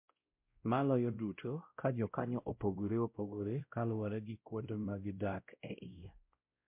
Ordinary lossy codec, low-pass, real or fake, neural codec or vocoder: MP3, 32 kbps; 3.6 kHz; fake; codec, 16 kHz, 0.5 kbps, X-Codec, WavLM features, trained on Multilingual LibriSpeech